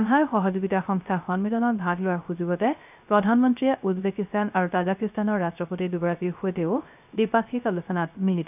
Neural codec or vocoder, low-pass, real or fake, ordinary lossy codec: codec, 16 kHz, 0.3 kbps, FocalCodec; 3.6 kHz; fake; none